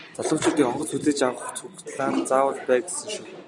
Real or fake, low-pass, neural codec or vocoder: real; 10.8 kHz; none